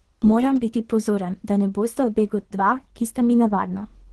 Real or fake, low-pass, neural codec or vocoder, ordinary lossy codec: fake; 10.8 kHz; codec, 24 kHz, 3 kbps, HILCodec; Opus, 16 kbps